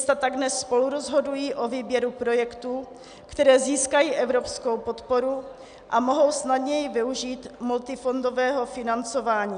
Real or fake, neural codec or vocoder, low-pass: real; none; 9.9 kHz